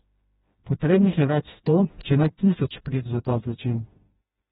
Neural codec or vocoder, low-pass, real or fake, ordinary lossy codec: codec, 16 kHz, 1 kbps, FreqCodec, smaller model; 7.2 kHz; fake; AAC, 16 kbps